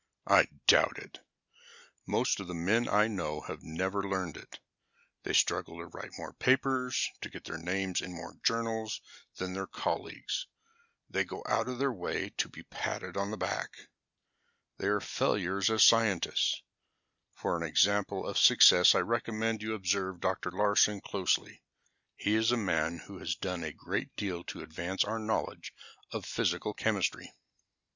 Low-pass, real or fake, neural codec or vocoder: 7.2 kHz; real; none